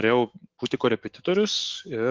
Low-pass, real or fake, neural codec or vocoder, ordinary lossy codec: 7.2 kHz; fake; autoencoder, 48 kHz, 128 numbers a frame, DAC-VAE, trained on Japanese speech; Opus, 16 kbps